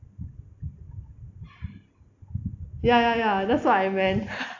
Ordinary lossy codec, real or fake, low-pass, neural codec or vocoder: AAC, 48 kbps; real; 7.2 kHz; none